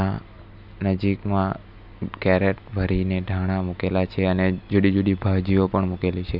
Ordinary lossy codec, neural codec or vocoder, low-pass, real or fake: none; none; 5.4 kHz; real